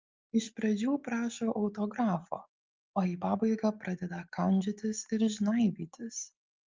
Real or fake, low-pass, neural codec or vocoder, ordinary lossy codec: real; 7.2 kHz; none; Opus, 24 kbps